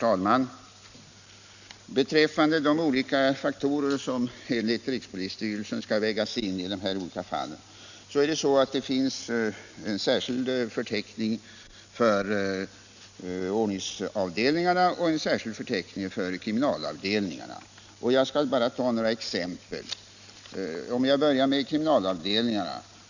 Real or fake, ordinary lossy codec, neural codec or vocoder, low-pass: real; none; none; 7.2 kHz